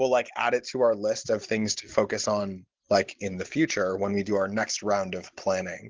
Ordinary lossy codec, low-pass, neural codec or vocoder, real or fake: Opus, 16 kbps; 7.2 kHz; none; real